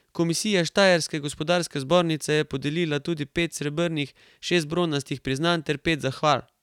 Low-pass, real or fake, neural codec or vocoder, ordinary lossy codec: 19.8 kHz; real; none; none